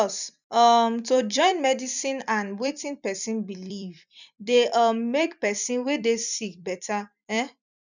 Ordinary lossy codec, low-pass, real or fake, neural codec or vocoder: none; 7.2 kHz; fake; vocoder, 44.1 kHz, 128 mel bands every 256 samples, BigVGAN v2